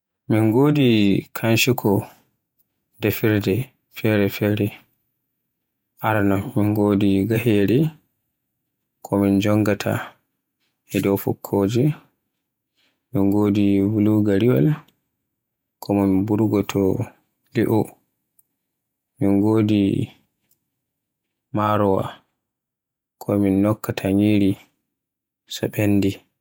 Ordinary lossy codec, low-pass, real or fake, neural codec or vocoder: none; 19.8 kHz; fake; vocoder, 48 kHz, 128 mel bands, Vocos